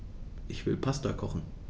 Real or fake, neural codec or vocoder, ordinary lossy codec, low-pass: real; none; none; none